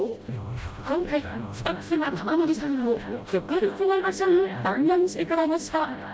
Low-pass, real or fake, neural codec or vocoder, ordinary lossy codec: none; fake; codec, 16 kHz, 0.5 kbps, FreqCodec, smaller model; none